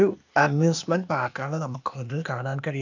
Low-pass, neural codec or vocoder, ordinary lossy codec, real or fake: 7.2 kHz; codec, 16 kHz, 0.8 kbps, ZipCodec; none; fake